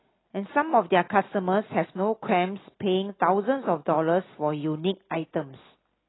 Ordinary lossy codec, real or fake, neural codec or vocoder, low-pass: AAC, 16 kbps; real; none; 7.2 kHz